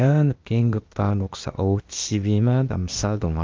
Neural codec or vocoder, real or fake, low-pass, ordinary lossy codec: codec, 16 kHz, 0.8 kbps, ZipCodec; fake; 7.2 kHz; Opus, 32 kbps